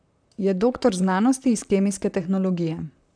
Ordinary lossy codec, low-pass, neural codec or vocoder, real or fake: none; 9.9 kHz; vocoder, 44.1 kHz, 128 mel bands, Pupu-Vocoder; fake